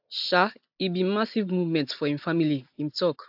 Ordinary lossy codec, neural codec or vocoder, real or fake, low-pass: AAC, 48 kbps; none; real; 5.4 kHz